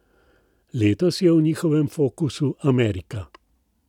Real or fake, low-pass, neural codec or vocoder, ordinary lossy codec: real; 19.8 kHz; none; none